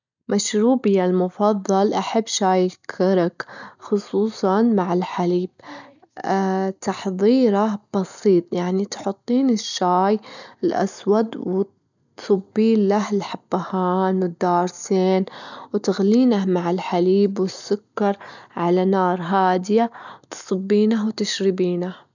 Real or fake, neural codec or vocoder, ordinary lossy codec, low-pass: real; none; none; 7.2 kHz